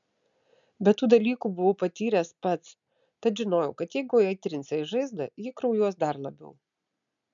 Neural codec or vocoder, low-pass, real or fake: none; 7.2 kHz; real